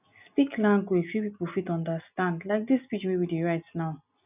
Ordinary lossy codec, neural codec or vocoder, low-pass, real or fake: none; none; 3.6 kHz; real